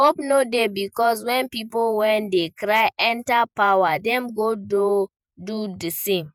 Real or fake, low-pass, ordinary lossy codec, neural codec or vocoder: fake; 19.8 kHz; none; vocoder, 48 kHz, 128 mel bands, Vocos